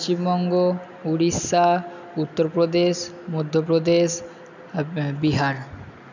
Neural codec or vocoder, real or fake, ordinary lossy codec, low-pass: none; real; none; 7.2 kHz